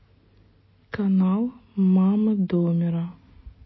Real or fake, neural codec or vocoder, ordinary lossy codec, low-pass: real; none; MP3, 24 kbps; 7.2 kHz